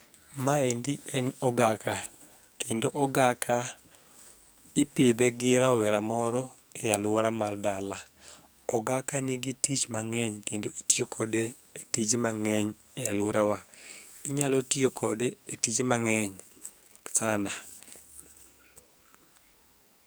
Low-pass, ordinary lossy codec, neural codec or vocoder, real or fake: none; none; codec, 44.1 kHz, 2.6 kbps, SNAC; fake